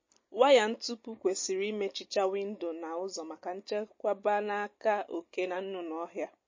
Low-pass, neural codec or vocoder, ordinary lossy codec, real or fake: 7.2 kHz; none; MP3, 32 kbps; real